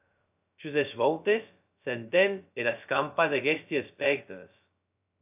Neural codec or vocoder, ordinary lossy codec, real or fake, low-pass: codec, 16 kHz, 0.2 kbps, FocalCodec; AAC, 32 kbps; fake; 3.6 kHz